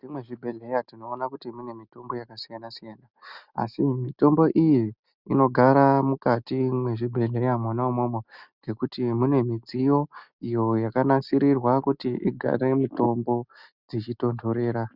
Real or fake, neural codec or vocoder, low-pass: real; none; 5.4 kHz